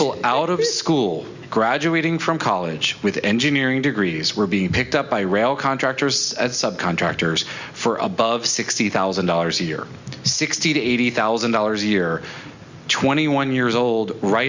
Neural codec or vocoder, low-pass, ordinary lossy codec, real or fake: none; 7.2 kHz; Opus, 64 kbps; real